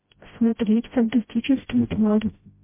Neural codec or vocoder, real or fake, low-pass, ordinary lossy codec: codec, 16 kHz, 0.5 kbps, FreqCodec, smaller model; fake; 3.6 kHz; MP3, 24 kbps